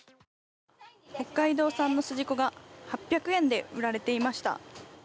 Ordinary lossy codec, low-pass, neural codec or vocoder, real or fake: none; none; none; real